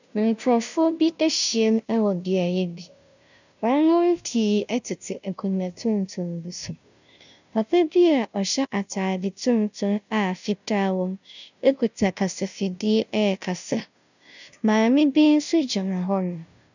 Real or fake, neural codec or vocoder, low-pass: fake; codec, 16 kHz, 0.5 kbps, FunCodec, trained on Chinese and English, 25 frames a second; 7.2 kHz